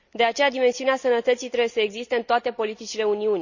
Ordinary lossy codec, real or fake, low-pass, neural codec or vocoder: none; real; 7.2 kHz; none